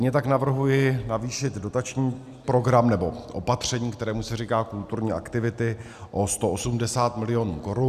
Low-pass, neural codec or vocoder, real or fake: 14.4 kHz; none; real